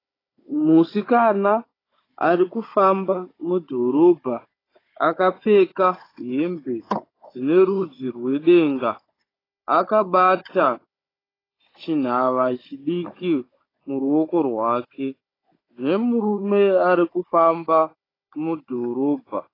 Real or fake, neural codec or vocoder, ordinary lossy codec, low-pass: fake; codec, 16 kHz, 16 kbps, FunCodec, trained on Chinese and English, 50 frames a second; AAC, 24 kbps; 5.4 kHz